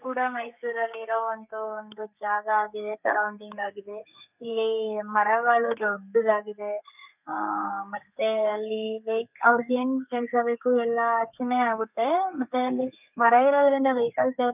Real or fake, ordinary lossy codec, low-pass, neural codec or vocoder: fake; none; 3.6 kHz; codec, 32 kHz, 1.9 kbps, SNAC